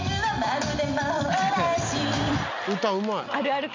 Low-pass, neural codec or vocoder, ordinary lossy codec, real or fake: 7.2 kHz; none; MP3, 64 kbps; real